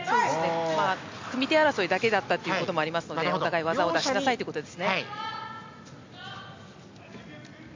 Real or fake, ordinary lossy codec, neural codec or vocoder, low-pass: real; MP3, 48 kbps; none; 7.2 kHz